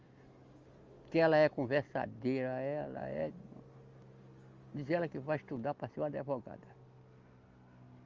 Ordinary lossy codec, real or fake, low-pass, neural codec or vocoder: Opus, 32 kbps; real; 7.2 kHz; none